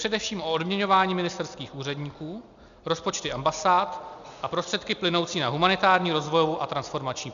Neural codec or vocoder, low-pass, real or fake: none; 7.2 kHz; real